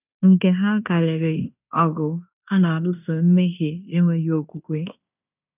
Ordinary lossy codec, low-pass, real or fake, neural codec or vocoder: none; 3.6 kHz; fake; codec, 16 kHz in and 24 kHz out, 0.9 kbps, LongCat-Audio-Codec, fine tuned four codebook decoder